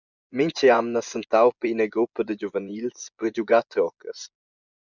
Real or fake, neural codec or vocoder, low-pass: fake; vocoder, 24 kHz, 100 mel bands, Vocos; 7.2 kHz